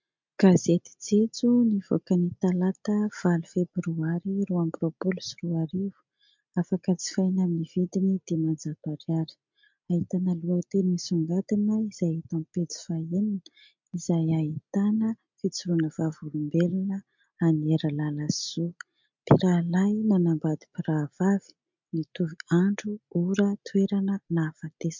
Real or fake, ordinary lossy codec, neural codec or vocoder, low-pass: real; MP3, 64 kbps; none; 7.2 kHz